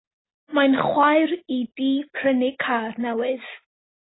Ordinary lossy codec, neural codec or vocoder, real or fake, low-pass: AAC, 16 kbps; none; real; 7.2 kHz